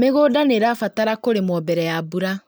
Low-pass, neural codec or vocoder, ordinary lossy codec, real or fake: none; none; none; real